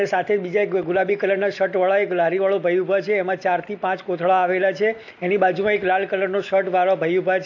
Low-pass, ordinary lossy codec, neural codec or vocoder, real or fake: 7.2 kHz; AAC, 48 kbps; none; real